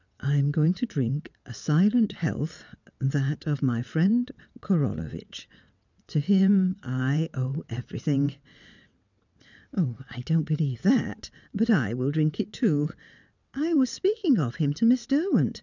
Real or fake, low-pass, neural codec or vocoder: fake; 7.2 kHz; vocoder, 22.05 kHz, 80 mel bands, WaveNeXt